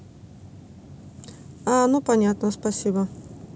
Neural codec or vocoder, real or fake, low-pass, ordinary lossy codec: none; real; none; none